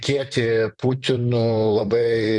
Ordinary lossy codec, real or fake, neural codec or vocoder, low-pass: MP3, 96 kbps; fake; vocoder, 44.1 kHz, 128 mel bands, Pupu-Vocoder; 10.8 kHz